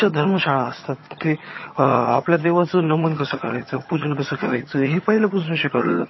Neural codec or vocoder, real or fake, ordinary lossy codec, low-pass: vocoder, 22.05 kHz, 80 mel bands, HiFi-GAN; fake; MP3, 24 kbps; 7.2 kHz